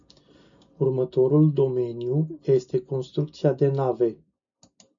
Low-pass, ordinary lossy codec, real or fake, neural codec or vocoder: 7.2 kHz; MP3, 64 kbps; real; none